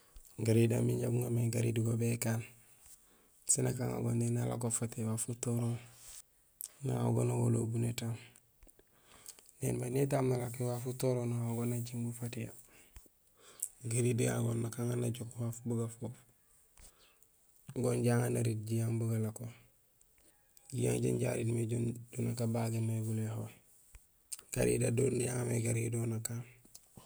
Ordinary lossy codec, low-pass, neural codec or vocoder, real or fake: none; none; none; real